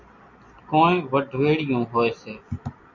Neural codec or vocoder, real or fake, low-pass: none; real; 7.2 kHz